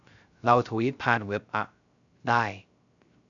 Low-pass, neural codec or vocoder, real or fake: 7.2 kHz; codec, 16 kHz, 0.3 kbps, FocalCodec; fake